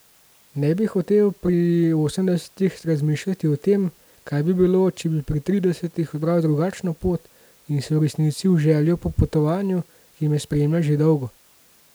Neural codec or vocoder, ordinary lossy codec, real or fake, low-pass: none; none; real; none